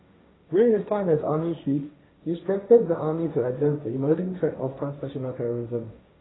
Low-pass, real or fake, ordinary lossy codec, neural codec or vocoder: 7.2 kHz; fake; AAC, 16 kbps; codec, 16 kHz, 1.1 kbps, Voila-Tokenizer